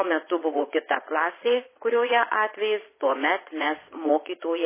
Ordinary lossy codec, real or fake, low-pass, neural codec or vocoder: MP3, 16 kbps; fake; 3.6 kHz; vocoder, 22.05 kHz, 80 mel bands, Vocos